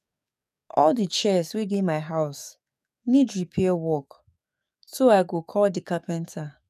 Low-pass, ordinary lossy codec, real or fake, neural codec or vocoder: 14.4 kHz; none; fake; codec, 44.1 kHz, 7.8 kbps, DAC